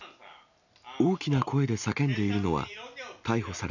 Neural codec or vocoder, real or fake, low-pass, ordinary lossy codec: none; real; 7.2 kHz; none